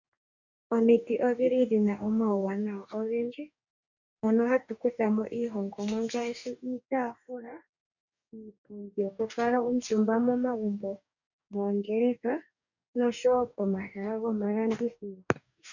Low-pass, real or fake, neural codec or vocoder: 7.2 kHz; fake; codec, 44.1 kHz, 2.6 kbps, DAC